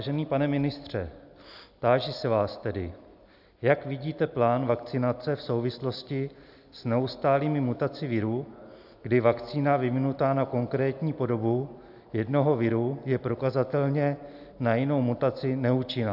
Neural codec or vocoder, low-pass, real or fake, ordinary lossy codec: none; 5.4 kHz; real; AAC, 48 kbps